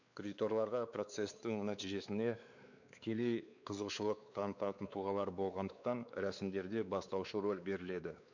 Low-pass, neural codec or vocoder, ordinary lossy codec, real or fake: 7.2 kHz; codec, 16 kHz, 4 kbps, X-Codec, WavLM features, trained on Multilingual LibriSpeech; none; fake